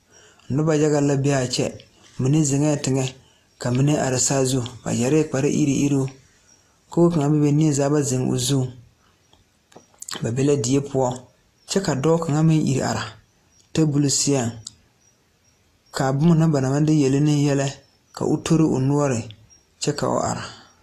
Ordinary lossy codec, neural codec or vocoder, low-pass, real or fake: AAC, 48 kbps; none; 14.4 kHz; real